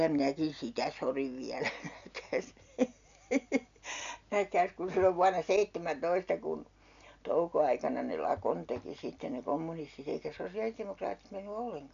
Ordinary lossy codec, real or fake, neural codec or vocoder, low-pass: MP3, 64 kbps; real; none; 7.2 kHz